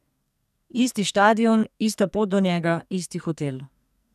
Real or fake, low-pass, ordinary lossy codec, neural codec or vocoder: fake; 14.4 kHz; none; codec, 44.1 kHz, 2.6 kbps, SNAC